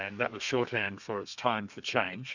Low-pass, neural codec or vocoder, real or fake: 7.2 kHz; codec, 32 kHz, 1.9 kbps, SNAC; fake